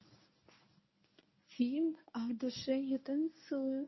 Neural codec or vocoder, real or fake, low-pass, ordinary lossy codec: codec, 24 kHz, 0.9 kbps, WavTokenizer, medium speech release version 1; fake; 7.2 kHz; MP3, 24 kbps